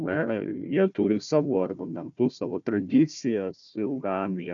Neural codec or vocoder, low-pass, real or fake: codec, 16 kHz, 1 kbps, FunCodec, trained on Chinese and English, 50 frames a second; 7.2 kHz; fake